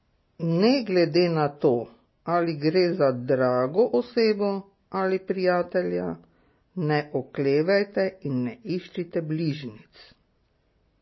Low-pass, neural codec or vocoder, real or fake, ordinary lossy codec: 7.2 kHz; none; real; MP3, 24 kbps